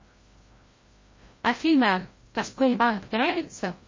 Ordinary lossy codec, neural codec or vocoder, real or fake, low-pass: MP3, 32 kbps; codec, 16 kHz, 0.5 kbps, FreqCodec, larger model; fake; 7.2 kHz